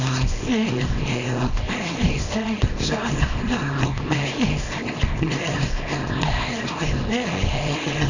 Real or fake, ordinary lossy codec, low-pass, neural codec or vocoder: fake; none; 7.2 kHz; codec, 24 kHz, 0.9 kbps, WavTokenizer, small release